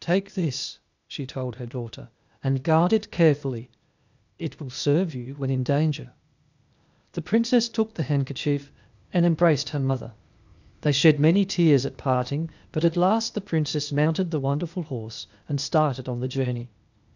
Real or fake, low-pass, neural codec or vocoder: fake; 7.2 kHz; codec, 16 kHz, 0.8 kbps, ZipCodec